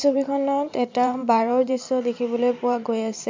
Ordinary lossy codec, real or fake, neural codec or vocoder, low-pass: MP3, 64 kbps; fake; vocoder, 44.1 kHz, 128 mel bands every 512 samples, BigVGAN v2; 7.2 kHz